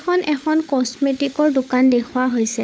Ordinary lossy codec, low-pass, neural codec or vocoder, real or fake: none; none; codec, 16 kHz, 16 kbps, FunCodec, trained on LibriTTS, 50 frames a second; fake